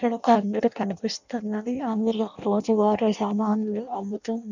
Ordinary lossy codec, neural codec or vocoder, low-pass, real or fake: none; codec, 16 kHz in and 24 kHz out, 0.6 kbps, FireRedTTS-2 codec; 7.2 kHz; fake